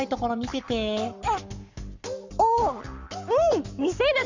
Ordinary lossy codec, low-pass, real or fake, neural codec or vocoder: Opus, 64 kbps; 7.2 kHz; fake; codec, 44.1 kHz, 7.8 kbps, Pupu-Codec